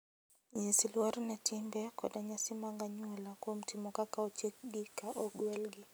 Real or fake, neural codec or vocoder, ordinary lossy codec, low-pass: real; none; none; none